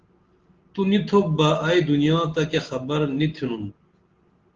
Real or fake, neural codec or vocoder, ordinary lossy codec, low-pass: real; none; Opus, 16 kbps; 7.2 kHz